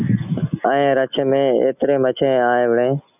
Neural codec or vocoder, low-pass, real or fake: none; 3.6 kHz; real